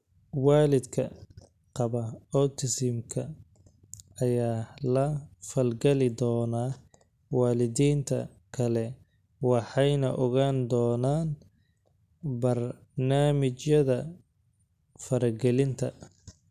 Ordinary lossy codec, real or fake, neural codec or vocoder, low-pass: none; real; none; 14.4 kHz